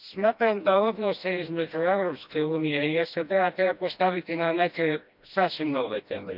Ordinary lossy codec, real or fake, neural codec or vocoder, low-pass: none; fake; codec, 16 kHz, 1 kbps, FreqCodec, smaller model; 5.4 kHz